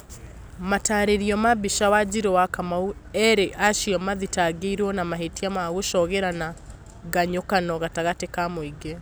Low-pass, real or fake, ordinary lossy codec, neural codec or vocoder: none; real; none; none